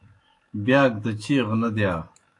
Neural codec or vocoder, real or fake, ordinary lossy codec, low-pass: autoencoder, 48 kHz, 128 numbers a frame, DAC-VAE, trained on Japanese speech; fake; AAC, 48 kbps; 10.8 kHz